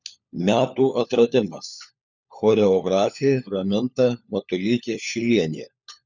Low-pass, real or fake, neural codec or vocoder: 7.2 kHz; fake; codec, 16 kHz, 4 kbps, FunCodec, trained on LibriTTS, 50 frames a second